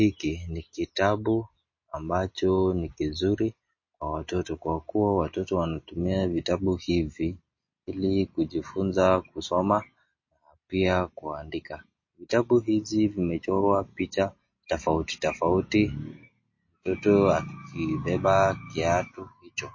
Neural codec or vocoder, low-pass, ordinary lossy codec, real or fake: none; 7.2 kHz; MP3, 32 kbps; real